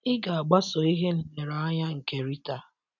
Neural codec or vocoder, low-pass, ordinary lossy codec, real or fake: none; 7.2 kHz; none; real